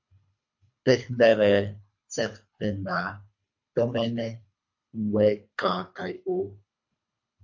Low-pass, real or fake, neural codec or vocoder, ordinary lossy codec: 7.2 kHz; fake; codec, 24 kHz, 3 kbps, HILCodec; MP3, 48 kbps